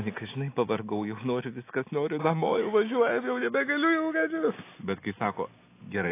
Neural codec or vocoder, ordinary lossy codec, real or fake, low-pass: none; AAC, 24 kbps; real; 3.6 kHz